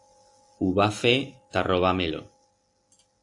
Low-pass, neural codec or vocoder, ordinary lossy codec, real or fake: 10.8 kHz; none; AAC, 64 kbps; real